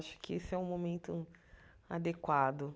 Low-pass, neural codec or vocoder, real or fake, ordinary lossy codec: none; none; real; none